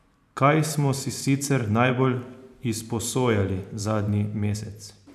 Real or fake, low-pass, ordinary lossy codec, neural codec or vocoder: fake; 14.4 kHz; none; vocoder, 44.1 kHz, 128 mel bands every 512 samples, BigVGAN v2